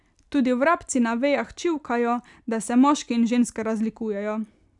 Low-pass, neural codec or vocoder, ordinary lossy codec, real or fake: 10.8 kHz; none; none; real